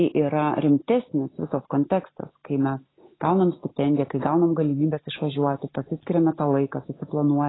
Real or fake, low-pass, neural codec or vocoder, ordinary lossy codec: real; 7.2 kHz; none; AAC, 16 kbps